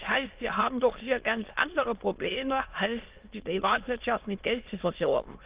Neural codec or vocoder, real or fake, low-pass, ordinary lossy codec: autoencoder, 22.05 kHz, a latent of 192 numbers a frame, VITS, trained on many speakers; fake; 3.6 kHz; Opus, 32 kbps